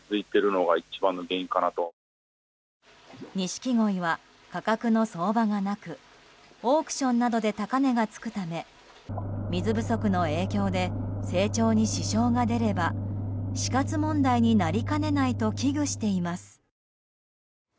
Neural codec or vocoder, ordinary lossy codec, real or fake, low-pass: none; none; real; none